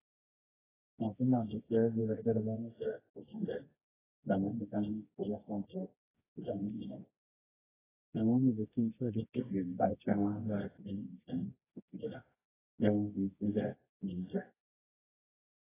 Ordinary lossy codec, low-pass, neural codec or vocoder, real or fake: AAC, 16 kbps; 3.6 kHz; codec, 24 kHz, 0.9 kbps, WavTokenizer, medium music audio release; fake